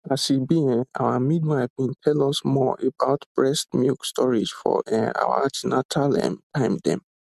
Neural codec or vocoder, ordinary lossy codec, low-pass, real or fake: none; MP3, 96 kbps; 14.4 kHz; real